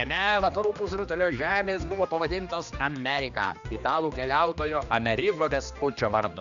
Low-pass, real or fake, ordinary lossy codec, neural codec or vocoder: 7.2 kHz; fake; MP3, 96 kbps; codec, 16 kHz, 2 kbps, X-Codec, HuBERT features, trained on general audio